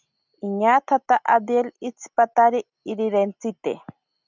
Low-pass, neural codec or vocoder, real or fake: 7.2 kHz; none; real